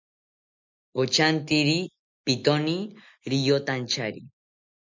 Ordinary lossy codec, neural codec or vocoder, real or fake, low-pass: MP3, 64 kbps; none; real; 7.2 kHz